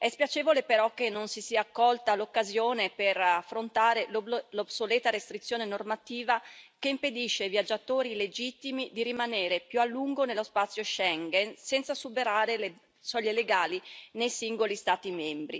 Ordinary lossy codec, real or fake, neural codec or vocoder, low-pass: none; real; none; none